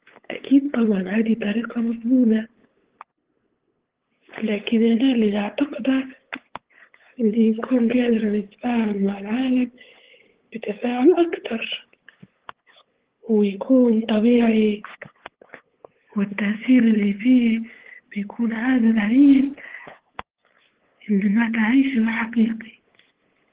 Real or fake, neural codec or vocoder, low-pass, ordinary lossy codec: fake; codec, 16 kHz, 8 kbps, FunCodec, trained on LibriTTS, 25 frames a second; 3.6 kHz; Opus, 16 kbps